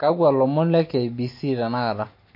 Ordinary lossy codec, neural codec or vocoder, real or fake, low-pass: MP3, 32 kbps; none; real; 5.4 kHz